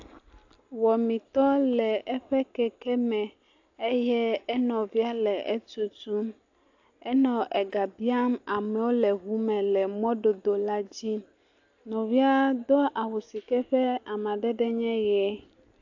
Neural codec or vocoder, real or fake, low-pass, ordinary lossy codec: none; real; 7.2 kHz; AAC, 48 kbps